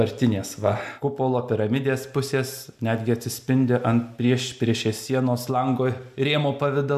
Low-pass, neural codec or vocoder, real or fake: 14.4 kHz; none; real